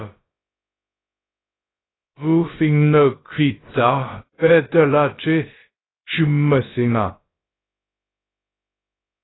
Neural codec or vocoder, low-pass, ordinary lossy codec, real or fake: codec, 16 kHz, about 1 kbps, DyCAST, with the encoder's durations; 7.2 kHz; AAC, 16 kbps; fake